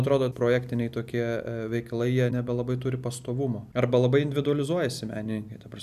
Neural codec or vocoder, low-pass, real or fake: vocoder, 44.1 kHz, 128 mel bands every 256 samples, BigVGAN v2; 14.4 kHz; fake